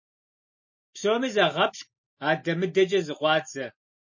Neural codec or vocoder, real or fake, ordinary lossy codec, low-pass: none; real; MP3, 32 kbps; 7.2 kHz